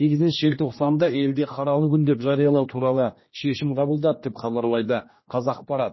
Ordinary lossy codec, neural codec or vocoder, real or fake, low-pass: MP3, 24 kbps; codec, 16 kHz, 1 kbps, X-Codec, HuBERT features, trained on general audio; fake; 7.2 kHz